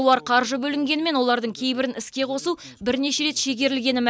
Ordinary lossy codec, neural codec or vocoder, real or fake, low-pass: none; none; real; none